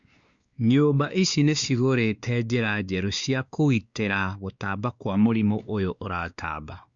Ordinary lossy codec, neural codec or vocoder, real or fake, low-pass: Opus, 64 kbps; codec, 16 kHz, 2 kbps, X-Codec, WavLM features, trained on Multilingual LibriSpeech; fake; 7.2 kHz